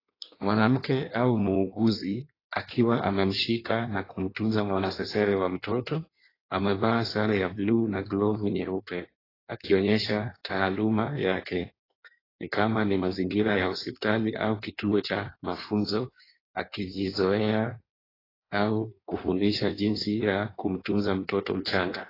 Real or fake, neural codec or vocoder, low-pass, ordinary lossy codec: fake; codec, 16 kHz in and 24 kHz out, 1.1 kbps, FireRedTTS-2 codec; 5.4 kHz; AAC, 24 kbps